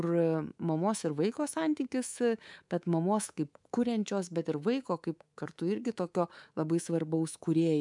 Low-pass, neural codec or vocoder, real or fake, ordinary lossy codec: 10.8 kHz; codec, 24 kHz, 3.1 kbps, DualCodec; fake; MP3, 96 kbps